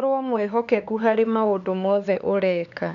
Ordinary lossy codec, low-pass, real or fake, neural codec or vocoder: none; 7.2 kHz; fake; codec, 16 kHz, 4 kbps, X-Codec, HuBERT features, trained on LibriSpeech